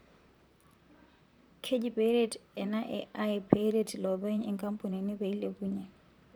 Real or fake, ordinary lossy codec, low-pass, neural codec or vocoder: fake; none; none; vocoder, 44.1 kHz, 128 mel bands, Pupu-Vocoder